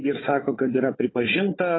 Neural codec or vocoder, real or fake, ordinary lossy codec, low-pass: codec, 16 kHz, 4 kbps, FunCodec, trained on Chinese and English, 50 frames a second; fake; AAC, 16 kbps; 7.2 kHz